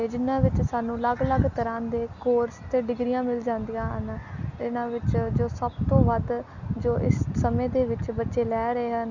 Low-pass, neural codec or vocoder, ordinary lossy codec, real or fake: 7.2 kHz; none; none; real